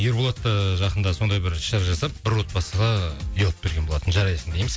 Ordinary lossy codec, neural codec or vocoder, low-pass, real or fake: none; none; none; real